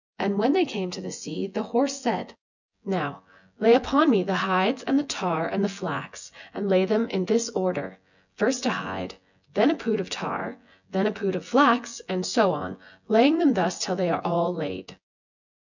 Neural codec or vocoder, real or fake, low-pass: vocoder, 24 kHz, 100 mel bands, Vocos; fake; 7.2 kHz